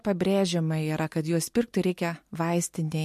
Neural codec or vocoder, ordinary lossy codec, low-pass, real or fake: none; MP3, 64 kbps; 14.4 kHz; real